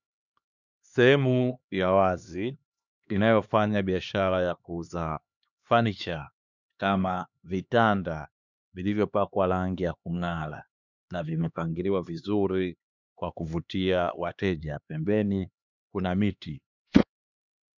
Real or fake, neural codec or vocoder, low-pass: fake; codec, 16 kHz, 2 kbps, X-Codec, HuBERT features, trained on LibriSpeech; 7.2 kHz